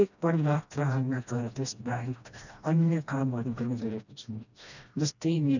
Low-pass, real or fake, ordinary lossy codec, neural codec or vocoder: 7.2 kHz; fake; none; codec, 16 kHz, 1 kbps, FreqCodec, smaller model